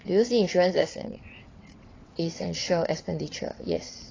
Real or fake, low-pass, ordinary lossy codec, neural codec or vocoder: fake; 7.2 kHz; AAC, 32 kbps; vocoder, 22.05 kHz, 80 mel bands, WaveNeXt